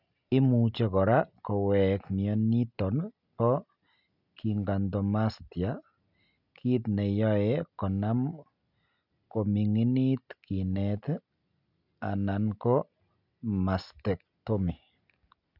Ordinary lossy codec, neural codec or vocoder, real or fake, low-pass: none; none; real; 5.4 kHz